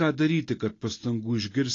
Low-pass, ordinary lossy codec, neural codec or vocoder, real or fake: 7.2 kHz; AAC, 32 kbps; none; real